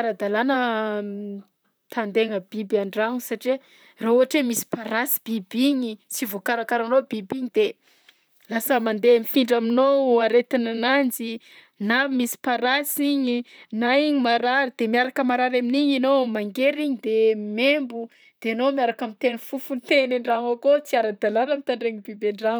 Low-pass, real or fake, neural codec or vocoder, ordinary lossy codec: none; fake; vocoder, 44.1 kHz, 128 mel bands, Pupu-Vocoder; none